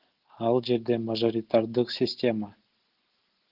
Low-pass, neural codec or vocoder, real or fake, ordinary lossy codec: 5.4 kHz; none; real; Opus, 16 kbps